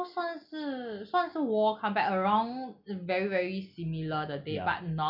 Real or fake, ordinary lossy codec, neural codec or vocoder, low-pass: real; none; none; 5.4 kHz